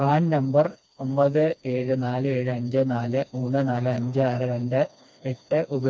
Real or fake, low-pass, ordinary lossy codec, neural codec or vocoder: fake; none; none; codec, 16 kHz, 2 kbps, FreqCodec, smaller model